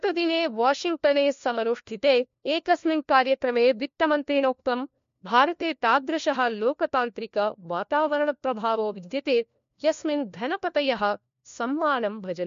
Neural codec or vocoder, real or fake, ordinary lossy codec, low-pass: codec, 16 kHz, 1 kbps, FunCodec, trained on LibriTTS, 50 frames a second; fake; MP3, 48 kbps; 7.2 kHz